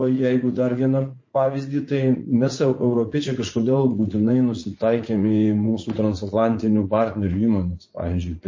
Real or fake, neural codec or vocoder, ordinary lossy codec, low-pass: fake; vocoder, 44.1 kHz, 80 mel bands, Vocos; MP3, 32 kbps; 7.2 kHz